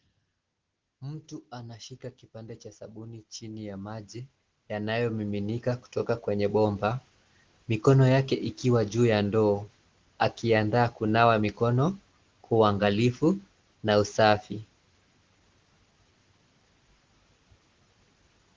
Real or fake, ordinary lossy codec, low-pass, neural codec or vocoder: real; Opus, 16 kbps; 7.2 kHz; none